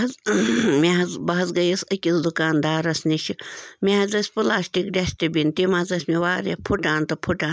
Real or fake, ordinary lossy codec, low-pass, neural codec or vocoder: real; none; none; none